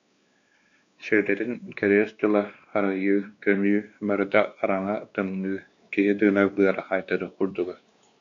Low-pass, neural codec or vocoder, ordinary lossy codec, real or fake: 7.2 kHz; codec, 16 kHz, 2 kbps, X-Codec, WavLM features, trained on Multilingual LibriSpeech; MP3, 96 kbps; fake